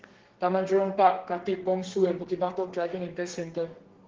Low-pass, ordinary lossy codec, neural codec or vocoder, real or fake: 7.2 kHz; Opus, 16 kbps; codec, 32 kHz, 1.9 kbps, SNAC; fake